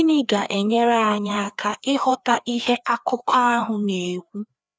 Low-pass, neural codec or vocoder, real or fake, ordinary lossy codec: none; codec, 16 kHz, 2 kbps, FreqCodec, larger model; fake; none